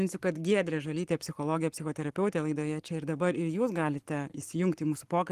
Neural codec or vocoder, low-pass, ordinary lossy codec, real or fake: codec, 44.1 kHz, 7.8 kbps, Pupu-Codec; 14.4 kHz; Opus, 24 kbps; fake